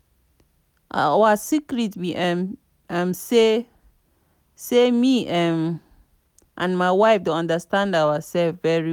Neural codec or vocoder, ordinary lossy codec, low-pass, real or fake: none; none; 19.8 kHz; real